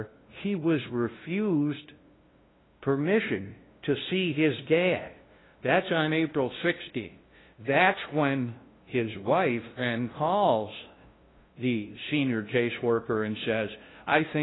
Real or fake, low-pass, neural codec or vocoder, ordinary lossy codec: fake; 7.2 kHz; codec, 16 kHz, 0.5 kbps, FunCodec, trained on LibriTTS, 25 frames a second; AAC, 16 kbps